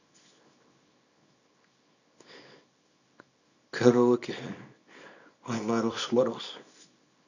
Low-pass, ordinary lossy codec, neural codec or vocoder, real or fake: 7.2 kHz; none; codec, 24 kHz, 0.9 kbps, WavTokenizer, small release; fake